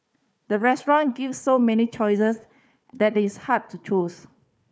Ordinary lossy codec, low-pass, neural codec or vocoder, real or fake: none; none; codec, 16 kHz, 4 kbps, FunCodec, trained on Chinese and English, 50 frames a second; fake